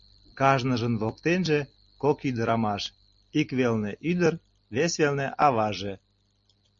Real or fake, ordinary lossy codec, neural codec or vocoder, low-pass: real; MP3, 48 kbps; none; 7.2 kHz